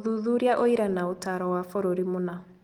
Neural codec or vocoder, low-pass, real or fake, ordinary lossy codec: none; 19.8 kHz; real; Opus, 24 kbps